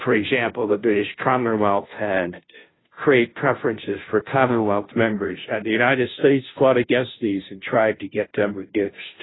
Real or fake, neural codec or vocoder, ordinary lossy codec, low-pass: fake; codec, 16 kHz, 0.5 kbps, FunCodec, trained on Chinese and English, 25 frames a second; AAC, 16 kbps; 7.2 kHz